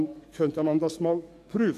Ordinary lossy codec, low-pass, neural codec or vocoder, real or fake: AAC, 64 kbps; 14.4 kHz; codec, 44.1 kHz, 7.8 kbps, DAC; fake